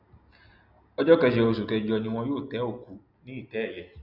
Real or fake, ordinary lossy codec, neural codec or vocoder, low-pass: real; none; none; 5.4 kHz